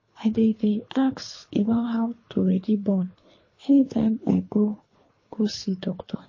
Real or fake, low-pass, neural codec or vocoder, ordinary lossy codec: fake; 7.2 kHz; codec, 24 kHz, 3 kbps, HILCodec; MP3, 32 kbps